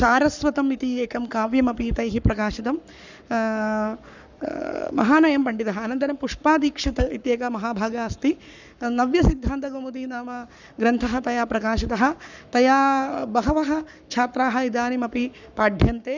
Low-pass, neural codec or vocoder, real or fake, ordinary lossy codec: 7.2 kHz; codec, 44.1 kHz, 7.8 kbps, Pupu-Codec; fake; none